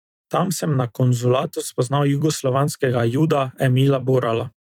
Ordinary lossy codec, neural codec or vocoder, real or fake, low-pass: none; vocoder, 44.1 kHz, 128 mel bands every 256 samples, BigVGAN v2; fake; 19.8 kHz